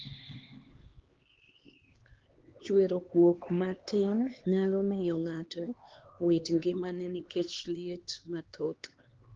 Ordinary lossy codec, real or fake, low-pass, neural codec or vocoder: Opus, 16 kbps; fake; 7.2 kHz; codec, 16 kHz, 2 kbps, X-Codec, HuBERT features, trained on LibriSpeech